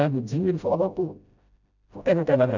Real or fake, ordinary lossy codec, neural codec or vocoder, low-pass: fake; none; codec, 16 kHz, 0.5 kbps, FreqCodec, smaller model; 7.2 kHz